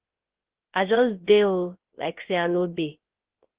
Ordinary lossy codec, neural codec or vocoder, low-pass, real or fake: Opus, 16 kbps; codec, 16 kHz, 0.3 kbps, FocalCodec; 3.6 kHz; fake